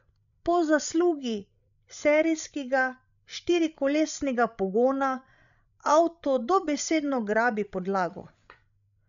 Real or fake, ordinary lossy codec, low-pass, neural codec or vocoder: fake; none; 7.2 kHz; codec, 16 kHz, 16 kbps, FreqCodec, larger model